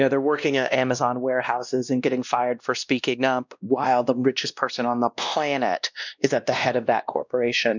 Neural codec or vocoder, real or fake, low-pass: codec, 16 kHz, 1 kbps, X-Codec, WavLM features, trained on Multilingual LibriSpeech; fake; 7.2 kHz